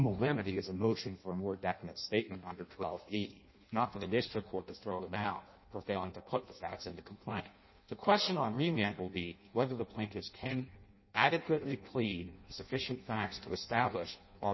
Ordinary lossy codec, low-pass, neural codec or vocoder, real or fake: MP3, 24 kbps; 7.2 kHz; codec, 16 kHz in and 24 kHz out, 0.6 kbps, FireRedTTS-2 codec; fake